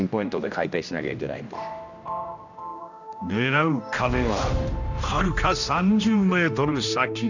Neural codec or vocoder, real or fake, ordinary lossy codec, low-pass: codec, 16 kHz, 1 kbps, X-Codec, HuBERT features, trained on general audio; fake; none; 7.2 kHz